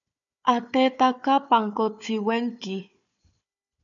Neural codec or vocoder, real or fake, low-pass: codec, 16 kHz, 4 kbps, FunCodec, trained on Chinese and English, 50 frames a second; fake; 7.2 kHz